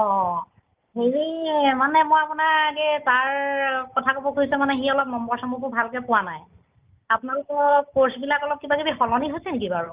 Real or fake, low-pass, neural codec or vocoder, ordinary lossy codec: real; 3.6 kHz; none; Opus, 24 kbps